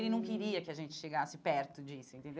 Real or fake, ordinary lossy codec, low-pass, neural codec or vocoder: real; none; none; none